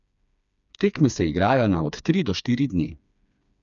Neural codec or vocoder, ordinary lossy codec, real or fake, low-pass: codec, 16 kHz, 4 kbps, FreqCodec, smaller model; none; fake; 7.2 kHz